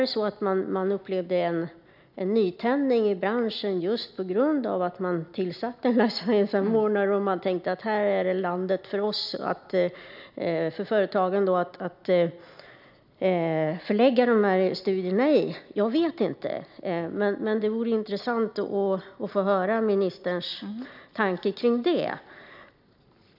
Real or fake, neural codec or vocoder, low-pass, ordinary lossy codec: real; none; 5.4 kHz; none